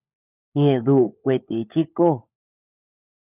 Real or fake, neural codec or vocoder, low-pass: fake; codec, 16 kHz, 16 kbps, FunCodec, trained on LibriTTS, 50 frames a second; 3.6 kHz